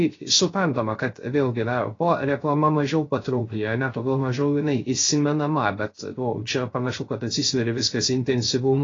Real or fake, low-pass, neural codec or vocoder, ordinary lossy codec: fake; 7.2 kHz; codec, 16 kHz, 0.3 kbps, FocalCodec; AAC, 32 kbps